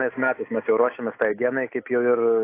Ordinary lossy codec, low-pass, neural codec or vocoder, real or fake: AAC, 24 kbps; 3.6 kHz; none; real